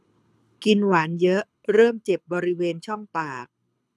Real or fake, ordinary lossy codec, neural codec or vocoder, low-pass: fake; none; codec, 24 kHz, 6 kbps, HILCodec; none